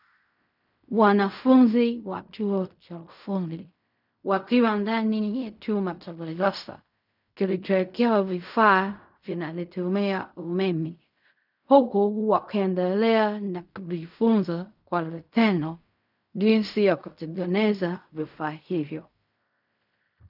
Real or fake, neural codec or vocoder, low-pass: fake; codec, 16 kHz in and 24 kHz out, 0.4 kbps, LongCat-Audio-Codec, fine tuned four codebook decoder; 5.4 kHz